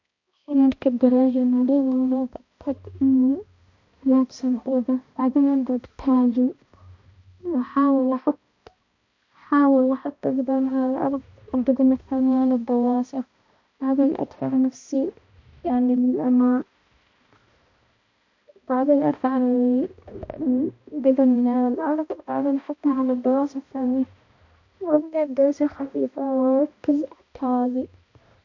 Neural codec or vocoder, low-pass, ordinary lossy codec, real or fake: codec, 16 kHz, 1 kbps, X-Codec, HuBERT features, trained on balanced general audio; 7.2 kHz; MP3, 48 kbps; fake